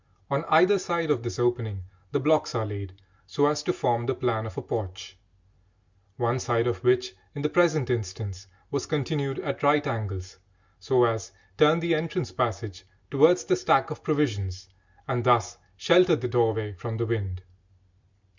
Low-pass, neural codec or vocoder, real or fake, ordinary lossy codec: 7.2 kHz; none; real; Opus, 64 kbps